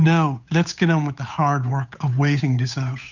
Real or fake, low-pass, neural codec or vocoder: fake; 7.2 kHz; codec, 16 kHz, 8 kbps, FunCodec, trained on Chinese and English, 25 frames a second